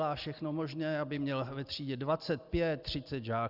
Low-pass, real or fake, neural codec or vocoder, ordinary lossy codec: 5.4 kHz; real; none; Opus, 64 kbps